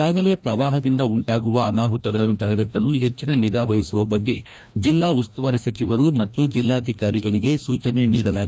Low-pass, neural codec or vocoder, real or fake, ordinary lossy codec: none; codec, 16 kHz, 1 kbps, FreqCodec, larger model; fake; none